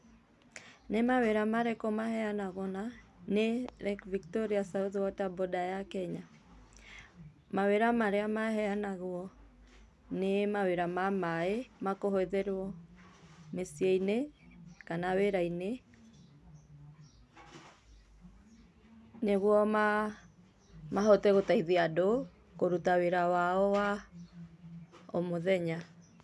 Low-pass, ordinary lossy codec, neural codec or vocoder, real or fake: none; none; none; real